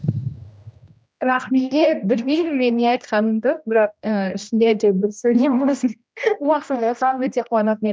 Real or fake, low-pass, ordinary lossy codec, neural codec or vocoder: fake; none; none; codec, 16 kHz, 1 kbps, X-Codec, HuBERT features, trained on general audio